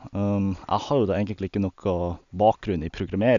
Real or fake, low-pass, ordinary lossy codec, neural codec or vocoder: real; 7.2 kHz; none; none